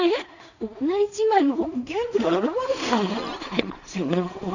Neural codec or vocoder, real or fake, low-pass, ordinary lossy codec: codec, 16 kHz in and 24 kHz out, 0.4 kbps, LongCat-Audio-Codec, two codebook decoder; fake; 7.2 kHz; none